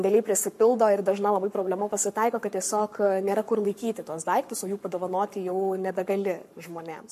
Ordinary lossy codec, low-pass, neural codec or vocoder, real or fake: MP3, 64 kbps; 14.4 kHz; codec, 44.1 kHz, 7.8 kbps, Pupu-Codec; fake